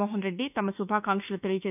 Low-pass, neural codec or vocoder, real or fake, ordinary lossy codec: 3.6 kHz; autoencoder, 48 kHz, 32 numbers a frame, DAC-VAE, trained on Japanese speech; fake; none